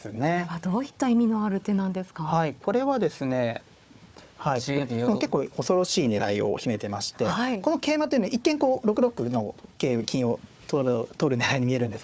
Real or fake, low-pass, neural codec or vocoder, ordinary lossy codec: fake; none; codec, 16 kHz, 4 kbps, FunCodec, trained on Chinese and English, 50 frames a second; none